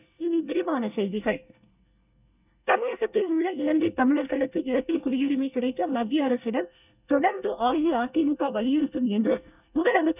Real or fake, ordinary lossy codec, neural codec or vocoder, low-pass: fake; none; codec, 24 kHz, 1 kbps, SNAC; 3.6 kHz